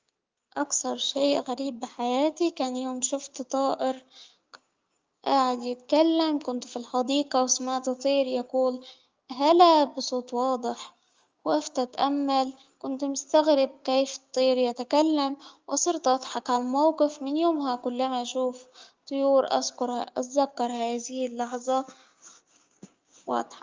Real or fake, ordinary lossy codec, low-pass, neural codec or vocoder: fake; Opus, 32 kbps; 7.2 kHz; codec, 16 kHz, 6 kbps, DAC